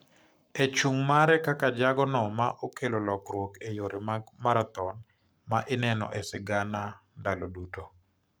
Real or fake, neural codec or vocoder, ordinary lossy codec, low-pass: fake; codec, 44.1 kHz, 7.8 kbps, Pupu-Codec; none; none